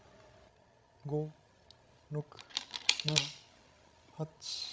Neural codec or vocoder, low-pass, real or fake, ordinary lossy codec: codec, 16 kHz, 16 kbps, FreqCodec, larger model; none; fake; none